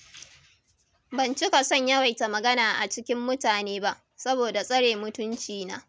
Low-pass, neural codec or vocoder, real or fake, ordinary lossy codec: none; none; real; none